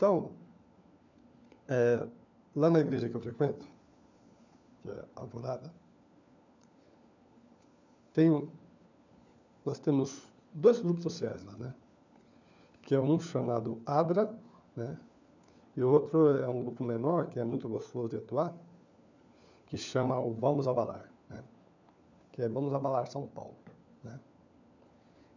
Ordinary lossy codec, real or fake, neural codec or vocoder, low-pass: none; fake; codec, 16 kHz, 4 kbps, FunCodec, trained on LibriTTS, 50 frames a second; 7.2 kHz